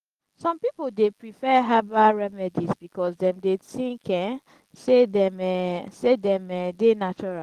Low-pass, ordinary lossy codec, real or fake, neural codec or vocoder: 14.4 kHz; Opus, 16 kbps; real; none